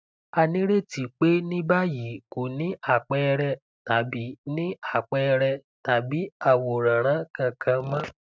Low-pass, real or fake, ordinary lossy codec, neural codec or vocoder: none; real; none; none